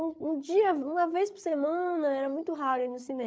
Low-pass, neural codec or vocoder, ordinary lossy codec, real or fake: none; codec, 16 kHz, 8 kbps, FreqCodec, larger model; none; fake